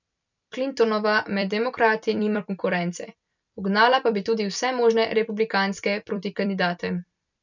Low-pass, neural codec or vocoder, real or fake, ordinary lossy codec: 7.2 kHz; vocoder, 44.1 kHz, 128 mel bands every 256 samples, BigVGAN v2; fake; none